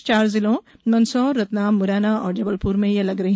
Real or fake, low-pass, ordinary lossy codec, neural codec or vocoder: real; none; none; none